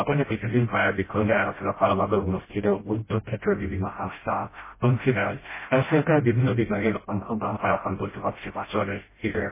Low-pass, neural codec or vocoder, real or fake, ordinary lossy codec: 3.6 kHz; codec, 16 kHz, 0.5 kbps, FreqCodec, smaller model; fake; MP3, 16 kbps